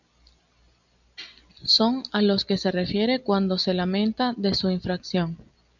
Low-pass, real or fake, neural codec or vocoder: 7.2 kHz; real; none